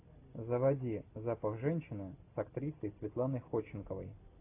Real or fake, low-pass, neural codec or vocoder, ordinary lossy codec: real; 3.6 kHz; none; AAC, 24 kbps